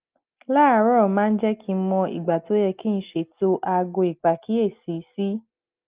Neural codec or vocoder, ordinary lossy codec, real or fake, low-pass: none; Opus, 24 kbps; real; 3.6 kHz